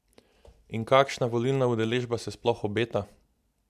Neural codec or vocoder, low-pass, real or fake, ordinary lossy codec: none; 14.4 kHz; real; MP3, 96 kbps